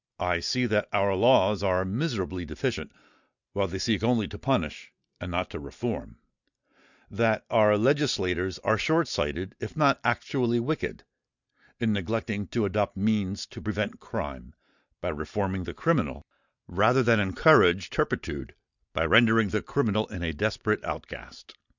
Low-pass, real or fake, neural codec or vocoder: 7.2 kHz; real; none